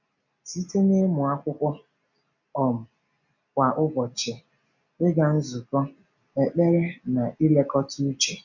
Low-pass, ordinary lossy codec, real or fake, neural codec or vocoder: 7.2 kHz; none; real; none